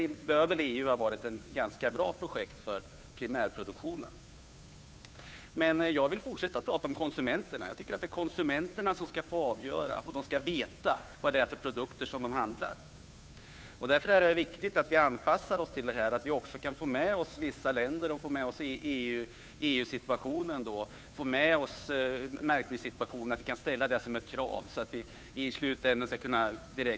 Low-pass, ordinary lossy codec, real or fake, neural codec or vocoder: none; none; fake; codec, 16 kHz, 2 kbps, FunCodec, trained on Chinese and English, 25 frames a second